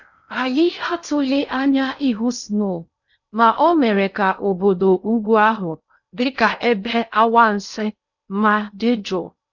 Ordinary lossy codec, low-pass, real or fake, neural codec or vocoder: none; 7.2 kHz; fake; codec, 16 kHz in and 24 kHz out, 0.6 kbps, FocalCodec, streaming, 4096 codes